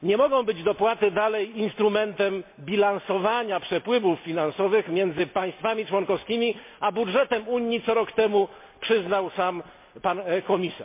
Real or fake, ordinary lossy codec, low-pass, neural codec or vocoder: real; MP3, 24 kbps; 3.6 kHz; none